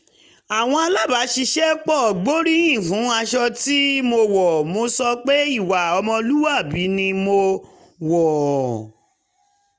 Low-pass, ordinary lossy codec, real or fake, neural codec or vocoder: none; none; real; none